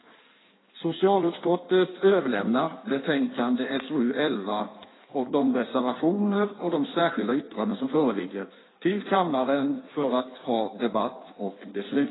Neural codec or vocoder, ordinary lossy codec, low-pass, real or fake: codec, 16 kHz in and 24 kHz out, 1.1 kbps, FireRedTTS-2 codec; AAC, 16 kbps; 7.2 kHz; fake